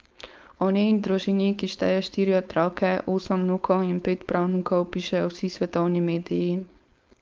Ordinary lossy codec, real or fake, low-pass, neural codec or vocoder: Opus, 24 kbps; fake; 7.2 kHz; codec, 16 kHz, 4.8 kbps, FACodec